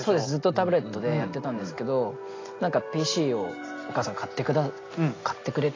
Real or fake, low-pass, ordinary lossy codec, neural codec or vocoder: real; 7.2 kHz; AAC, 32 kbps; none